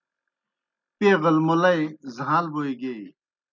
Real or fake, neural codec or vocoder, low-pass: real; none; 7.2 kHz